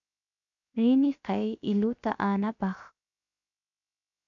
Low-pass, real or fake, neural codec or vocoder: 7.2 kHz; fake; codec, 16 kHz, 0.7 kbps, FocalCodec